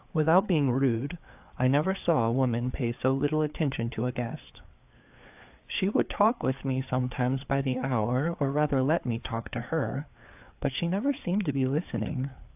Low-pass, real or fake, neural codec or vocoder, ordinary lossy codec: 3.6 kHz; fake; codec, 16 kHz, 4 kbps, FreqCodec, larger model; AAC, 32 kbps